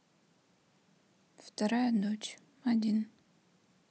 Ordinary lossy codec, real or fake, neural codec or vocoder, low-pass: none; real; none; none